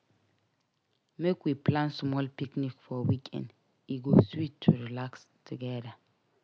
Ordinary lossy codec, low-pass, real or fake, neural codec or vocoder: none; none; real; none